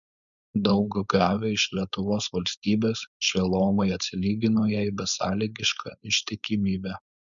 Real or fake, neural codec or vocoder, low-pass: fake; codec, 16 kHz, 4.8 kbps, FACodec; 7.2 kHz